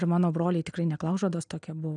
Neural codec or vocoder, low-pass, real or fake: none; 9.9 kHz; real